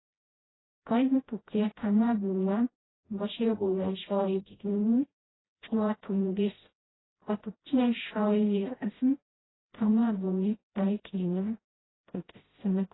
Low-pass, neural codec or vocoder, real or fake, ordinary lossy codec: 7.2 kHz; codec, 16 kHz, 0.5 kbps, FreqCodec, smaller model; fake; AAC, 16 kbps